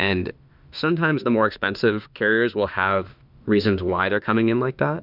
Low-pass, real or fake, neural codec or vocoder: 5.4 kHz; fake; autoencoder, 48 kHz, 32 numbers a frame, DAC-VAE, trained on Japanese speech